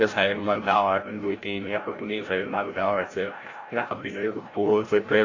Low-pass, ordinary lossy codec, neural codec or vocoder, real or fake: 7.2 kHz; AAC, 32 kbps; codec, 16 kHz, 0.5 kbps, FreqCodec, larger model; fake